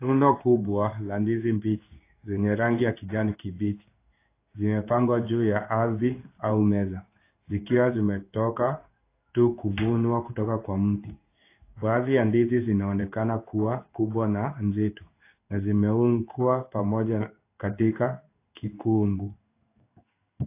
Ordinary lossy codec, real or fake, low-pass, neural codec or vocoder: AAC, 24 kbps; fake; 3.6 kHz; codec, 16 kHz in and 24 kHz out, 1 kbps, XY-Tokenizer